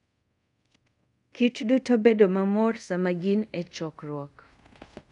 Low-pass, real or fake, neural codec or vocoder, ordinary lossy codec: 10.8 kHz; fake; codec, 24 kHz, 0.5 kbps, DualCodec; none